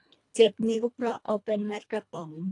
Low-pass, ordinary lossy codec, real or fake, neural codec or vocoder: 10.8 kHz; AAC, 48 kbps; fake; codec, 24 kHz, 1.5 kbps, HILCodec